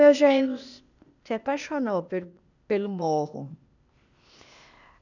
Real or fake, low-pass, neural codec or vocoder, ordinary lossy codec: fake; 7.2 kHz; codec, 16 kHz, 0.8 kbps, ZipCodec; none